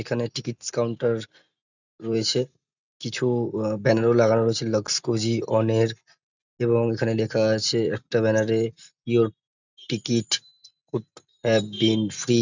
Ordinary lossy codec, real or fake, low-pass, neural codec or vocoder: none; real; 7.2 kHz; none